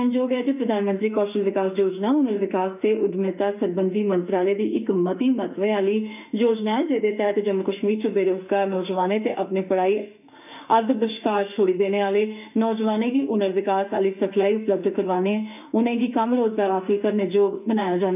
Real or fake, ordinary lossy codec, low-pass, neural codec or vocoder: fake; none; 3.6 kHz; autoencoder, 48 kHz, 32 numbers a frame, DAC-VAE, trained on Japanese speech